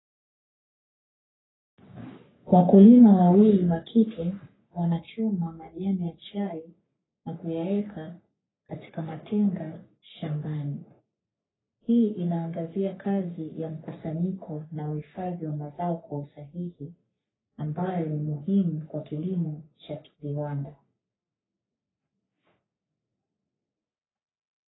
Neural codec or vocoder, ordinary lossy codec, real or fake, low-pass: codec, 44.1 kHz, 3.4 kbps, Pupu-Codec; AAC, 16 kbps; fake; 7.2 kHz